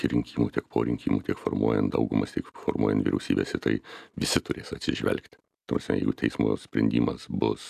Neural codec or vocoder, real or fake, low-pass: none; real; 14.4 kHz